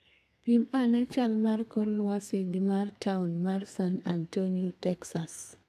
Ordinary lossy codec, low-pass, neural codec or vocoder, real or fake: none; 14.4 kHz; codec, 32 kHz, 1.9 kbps, SNAC; fake